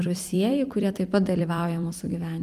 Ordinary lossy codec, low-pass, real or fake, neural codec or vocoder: Opus, 32 kbps; 14.4 kHz; fake; vocoder, 44.1 kHz, 128 mel bands every 256 samples, BigVGAN v2